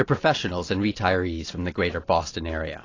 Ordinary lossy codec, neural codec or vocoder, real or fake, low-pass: AAC, 32 kbps; none; real; 7.2 kHz